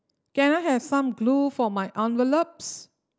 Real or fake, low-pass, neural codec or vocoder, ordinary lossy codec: real; none; none; none